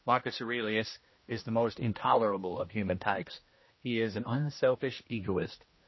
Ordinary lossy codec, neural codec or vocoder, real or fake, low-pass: MP3, 24 kbps; codec, 16 kHz, 1 kbps, X-Codec, HuBERT features, trained on general audio; fake; 7.2 kHz